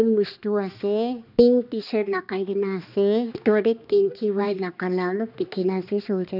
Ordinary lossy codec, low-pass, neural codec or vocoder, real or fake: none; 5.4 kHz; codec, 16 kHz, 2 kbps, X-Codec, HuBERT features, trained on balanced general audio; fake